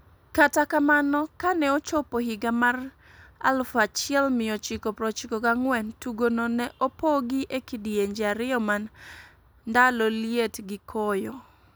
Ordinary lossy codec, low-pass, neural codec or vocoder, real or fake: none; none; none; real